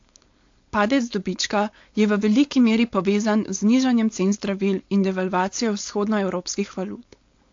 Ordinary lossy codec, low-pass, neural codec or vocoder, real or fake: AAC, 48 kbps; 7.2 kHz; codec, 16 kHz, 4.8 kbps, FACodec; fake